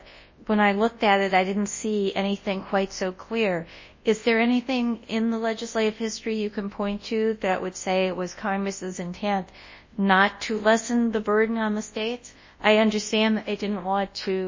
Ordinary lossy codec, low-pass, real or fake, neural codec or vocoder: MP3, 32 kbps; 7.2 kHz; fake; codec, 24 kHz, 0.9 kbps, WavTokenizer, large speech release